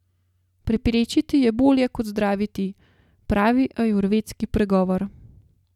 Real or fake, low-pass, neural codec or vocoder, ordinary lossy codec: real; 19.8 kHz; none; none